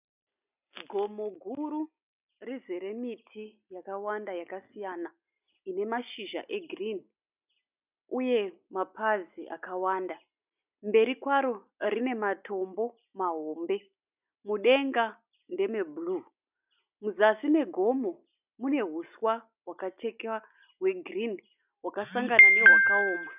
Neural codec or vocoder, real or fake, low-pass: none; real; 3.6 kHz